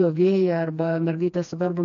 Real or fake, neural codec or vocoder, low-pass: fake; codec, 16 kHz, 2 kbps, FreqCodec, smaller model; 7.2 kHz